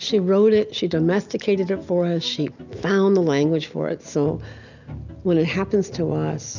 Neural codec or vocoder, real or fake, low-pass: none; real; 7.2 kHz